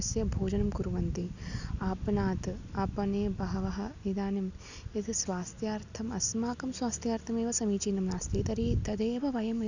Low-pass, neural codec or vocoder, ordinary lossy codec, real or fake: 7.2 kHz; none; none; real